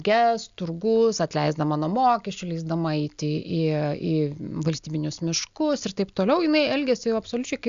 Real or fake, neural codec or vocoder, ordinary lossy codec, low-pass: real; none; Opus, 64 kbps; 7.2 kHz